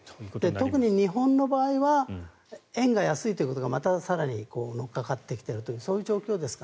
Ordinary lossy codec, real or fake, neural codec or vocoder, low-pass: none; real; none; none